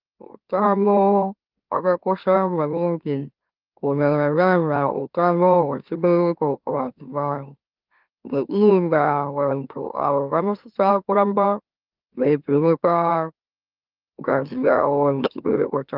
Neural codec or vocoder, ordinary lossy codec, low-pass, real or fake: autoencoder, 44.1 kHz, a latent of 192 numbers a frame, MeloTTS; Opus, 24 kbps; 5.4 kHz; fake